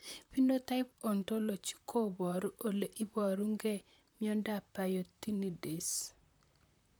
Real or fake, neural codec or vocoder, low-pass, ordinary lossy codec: fake; vocoder, 44.1 kHz, 128 mel bands, Pupu-Vocoder; none; none